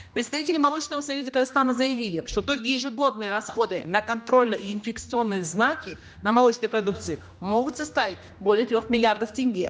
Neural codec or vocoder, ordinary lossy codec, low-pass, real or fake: codec, 16 kHz, 1 kbps, X-Codec, HuBERT features, trained on general audio; none; none; fake